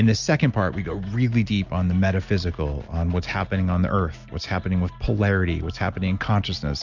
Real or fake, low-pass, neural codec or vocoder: real; 7.2 kHz; none